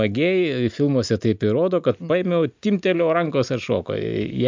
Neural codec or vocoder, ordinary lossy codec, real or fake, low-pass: none; MP3, 64 kbps; real; 7.2 kHz